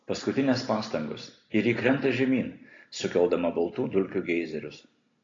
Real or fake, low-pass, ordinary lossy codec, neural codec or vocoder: real; 7.2 kHz; AAC, 32 kbps; none